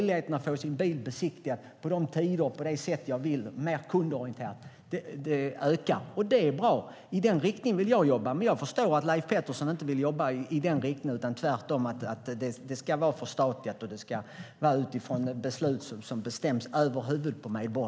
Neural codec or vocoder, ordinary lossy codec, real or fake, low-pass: none; none; real; none